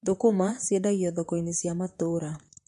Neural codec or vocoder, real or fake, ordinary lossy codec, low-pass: vocoder, 44.1 kHz, 128 mel bands, Pupu-Vocoder; fake; MP3, 48 kbps; 14.4 kHz